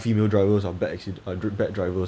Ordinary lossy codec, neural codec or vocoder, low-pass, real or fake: none; none; none; real